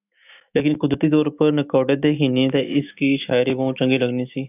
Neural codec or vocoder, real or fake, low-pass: autoencoder, 48 kHz, 128 numbers a frame, DAC-VAE, trained on Japanese speech; fake; 3.6 kHz